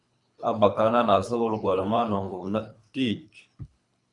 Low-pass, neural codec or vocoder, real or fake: 10.8 kHz; codec, 24 kHz, 3 kbps, HILCodec; fake